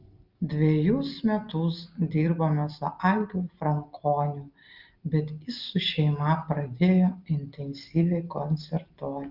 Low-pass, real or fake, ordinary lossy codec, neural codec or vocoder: 5.4 kHz; real; Opus, 24 kbps; none